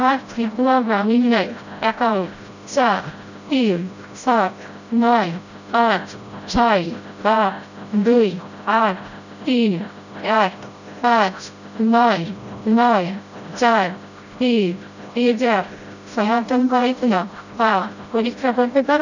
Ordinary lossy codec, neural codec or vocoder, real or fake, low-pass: none; codec, 16 kHz, 0.5 kbps, FreqCodec, smaller model; fake; 7.2 kHz